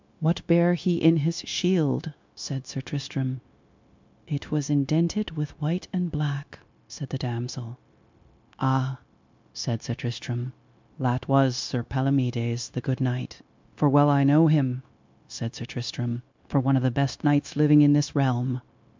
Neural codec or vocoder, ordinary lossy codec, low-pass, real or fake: codec, 16 kHz, 0.9 kbps, LongCat-Audio-Codec; MP3, 64 kbps; 7.2 kHz; fake